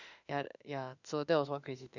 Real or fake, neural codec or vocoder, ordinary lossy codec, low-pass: fake; autoencoder, 48 kHz, 32 numbers a frame, DAC-VAE, trained on Japanese speech; none; 7.2 kHz